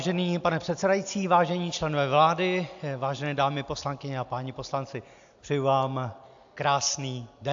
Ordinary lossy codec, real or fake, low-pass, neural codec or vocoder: AAC, 64 kbps; real; 7.2 kHz; none